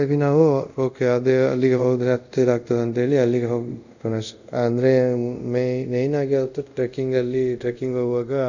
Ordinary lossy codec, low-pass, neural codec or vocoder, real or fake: none; 7.2 kHz; codec, 24 kHz, 0.5 kbps, DualCodec; fake